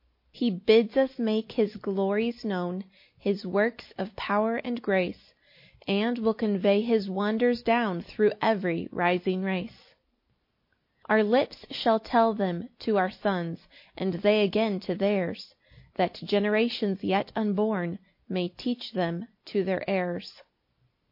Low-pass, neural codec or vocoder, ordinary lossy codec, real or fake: 5.4 kHz; none; MP3, 32 kbps; real